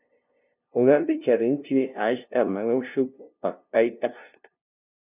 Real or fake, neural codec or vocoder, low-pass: fake; codec, 16 kHz, 0.5 kbps, FunCodec, trained on LibriTTS, 25 frames a second; 3.6 kHz